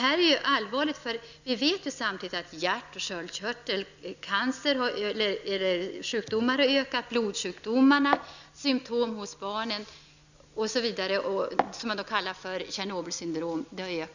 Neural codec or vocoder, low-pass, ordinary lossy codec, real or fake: none; 7.2 kHz; none; real